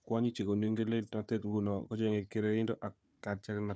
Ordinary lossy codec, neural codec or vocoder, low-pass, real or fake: none; codec, 16 kHz, 4 kbps, FunCodec, trained on Chinese and English, 50 frames a second; none; fake